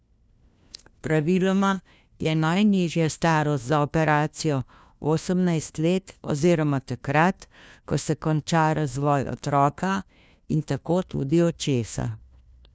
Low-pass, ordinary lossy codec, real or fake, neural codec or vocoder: none; none; fake; codec, 16 kHz, 1 kbps, FunCodec, trained on LibriTTS, 50 frames a second